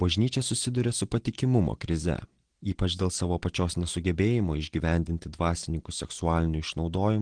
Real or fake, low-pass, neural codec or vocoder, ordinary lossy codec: real; 9.9 kHz; none; Opus, 16 kbps